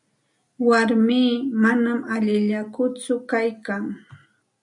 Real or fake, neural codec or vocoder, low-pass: real; none; 10.8 kHz